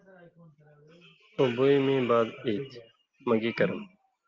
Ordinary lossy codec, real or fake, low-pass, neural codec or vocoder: Opus, 24 kbps; real; 7.2 kHz; none